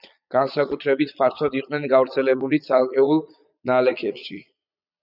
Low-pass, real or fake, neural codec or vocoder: 5.4 kHz; fake; vocoder, 22.05 kHz, 80 mel bands, Vocos